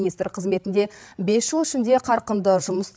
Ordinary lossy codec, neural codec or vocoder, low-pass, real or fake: none; codec, 16 kHz, 8 kbps, FreqCodec, larger model; none; fake